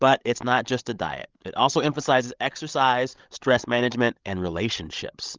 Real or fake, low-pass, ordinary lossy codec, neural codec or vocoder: fake; 7.2 kHz; Opus, 32 kbps; codec, 16 kHz, 16 kbps, FreqCodec, larger model